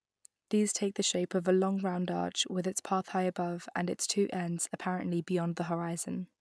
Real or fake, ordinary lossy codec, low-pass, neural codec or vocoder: real; none; none; none